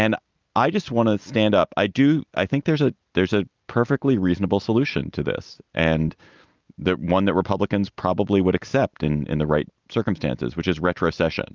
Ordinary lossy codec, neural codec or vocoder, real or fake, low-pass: Opus, 24 kbps; none; real; 7.2 kHz